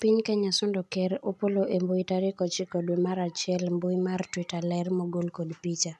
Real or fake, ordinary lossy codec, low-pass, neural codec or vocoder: real; none; none; none